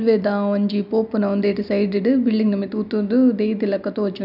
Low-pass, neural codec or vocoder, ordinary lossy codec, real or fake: 5.4 kHz; none; none; real